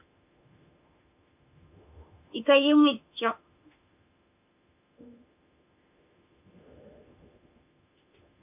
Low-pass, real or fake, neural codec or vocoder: 3.6 kHz; fake; autoencoder, 48 kHz, 32 numbers a frame, DAC-VAE, trained on Japanese speech